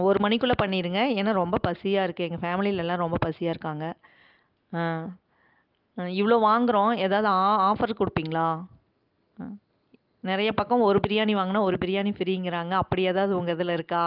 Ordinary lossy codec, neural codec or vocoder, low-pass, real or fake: Opus, 32 kbps; none; 5.4 kHz; real